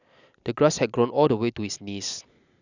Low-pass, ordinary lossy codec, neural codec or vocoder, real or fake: 7.2 kHz; none; none; real